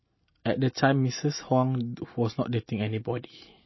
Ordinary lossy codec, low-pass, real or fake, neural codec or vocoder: MP3, 24 kbps; 7.2 kHz; real; none